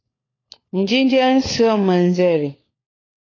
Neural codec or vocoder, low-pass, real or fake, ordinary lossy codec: codec, 16 kHz, 4 kbps, FunCodec, trained on LibriTTS, 50 frames a second; 7.2 kHz; fake; AAC, 32 kbps